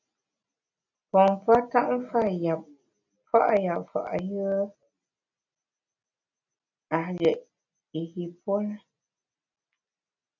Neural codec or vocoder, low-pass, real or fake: none; 7.2 kHz; real